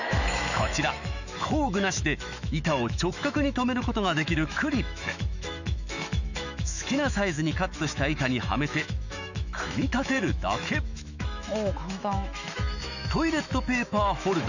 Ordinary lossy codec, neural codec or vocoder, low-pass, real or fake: none; autoencoder, 48 kHz, 128 numbers a frame, DAC-VAE, trained on Japanese speech; 7.2 kHz; fake